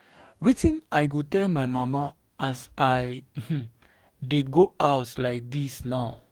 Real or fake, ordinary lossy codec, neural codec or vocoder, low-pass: fake; Opus, 32 kbps; codec, 44.1 kHz, 2.6 kbps, DAC; 19.8 kHz